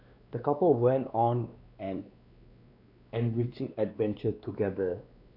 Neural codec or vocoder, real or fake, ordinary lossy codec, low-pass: codec, 16 kHz, 2 kbps, X-Codec, WavLM features, trained on Multilingual LibriSpeech; fake; none; 5.4 kHz